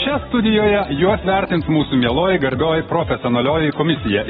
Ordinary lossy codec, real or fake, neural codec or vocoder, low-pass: AAC, 16 kbps; real; none; 19.8 kHz